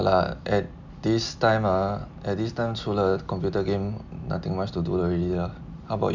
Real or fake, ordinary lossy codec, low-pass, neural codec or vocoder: real; none; 7.2 kHz; none